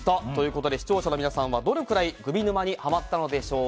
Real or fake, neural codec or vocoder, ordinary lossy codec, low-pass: real; none; none; none